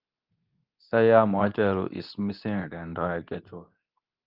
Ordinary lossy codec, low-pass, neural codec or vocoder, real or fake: Opus, 24 kbps; 5.4 kHz; codec, 24 kHz, 0.9 kbps, WavTokenizer, medium speech release version 2; fake